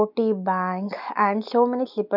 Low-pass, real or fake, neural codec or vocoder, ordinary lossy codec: 5.4 kHz; real; none; none